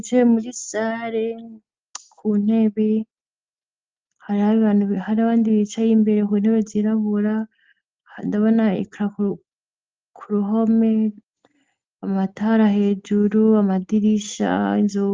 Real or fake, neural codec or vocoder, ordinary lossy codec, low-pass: real; none; Opus, 24 kbps; 7.2 kHz